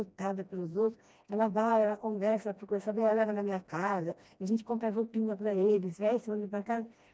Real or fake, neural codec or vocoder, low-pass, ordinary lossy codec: fake; codec, 16 kHz, 1 kbps, FreqCodec, smaller model; none; none